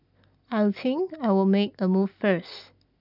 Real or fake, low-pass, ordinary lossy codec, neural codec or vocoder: real; 5.4 kHz; none; none